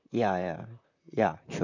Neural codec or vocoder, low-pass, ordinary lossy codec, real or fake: vocoder, 44.1 kHz, 128 mel bands, Pupu-Vocoder; 7.2 kHz; none; fake